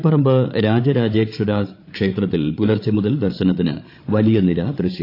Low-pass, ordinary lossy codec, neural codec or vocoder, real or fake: 5.4 kHz; AAC, 32 kbps; codec, 16 kHz, 8 kbps, FreqCodec, larger model; fake